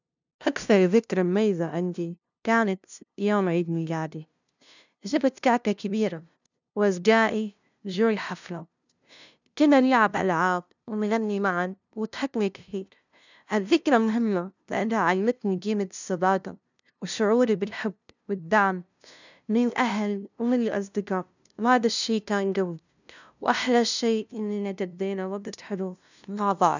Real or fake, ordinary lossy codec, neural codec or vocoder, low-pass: fake; none; codec, 16 kHz, 0.5 kbps, FunCodec, trained on LibriTTS, 25 frames a second; 7.2 kHz